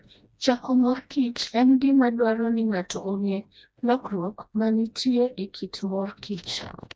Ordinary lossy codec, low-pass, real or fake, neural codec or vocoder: none; none; fake; codec, 16 kHz, 1 kbps, FreqCodec, smaller model